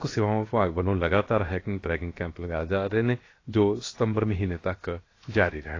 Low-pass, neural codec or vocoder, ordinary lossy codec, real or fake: 7.2 kHz; codec, 16 kHz, 0.7 kbps, FocalCodec; AAC, 32 kbps; fake